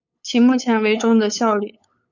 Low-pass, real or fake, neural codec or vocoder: 7.2 kHz; fake; codec, 16 kHz, 8 kbps, FunCodec, trained on LibriTTS, 25 frames a second